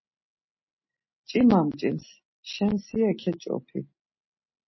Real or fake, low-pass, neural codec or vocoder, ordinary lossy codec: real; 7.2 kHz; none; MP3, 24 kbps